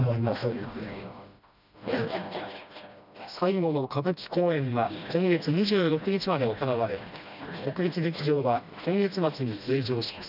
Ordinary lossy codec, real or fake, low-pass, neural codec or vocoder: none; fake; 5.4 kHz; codec, 16 kHz, 1 kbps, FreqCodec, smaller model